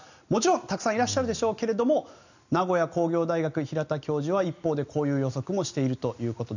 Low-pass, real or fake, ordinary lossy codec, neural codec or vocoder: 7.2 kHz; real; none; none